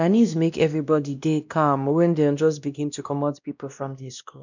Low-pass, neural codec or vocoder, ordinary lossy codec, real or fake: 7.2 kHz; codec, 16 kHz, 1 kbps, X-Codec, WavLM features, trained on Multilingual LibriSpeech; none; fake